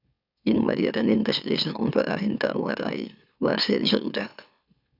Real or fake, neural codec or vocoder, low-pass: fake; autoencoder, 44.1 kHz, a latent of 192 numbers a frame, MeloTTS; 5.4 kHz